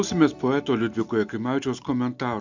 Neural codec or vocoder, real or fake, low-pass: none; real; 7.2 kHz